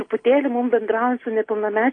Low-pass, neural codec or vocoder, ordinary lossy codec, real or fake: 9.9 kHz; none; AAC, 32 kbps; real